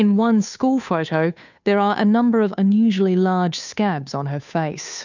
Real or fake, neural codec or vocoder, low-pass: fake; codec, 16 kHz, 2 kbps, FunCodec, trained on Chinese and English, 25 frames a second; 7.2 kHz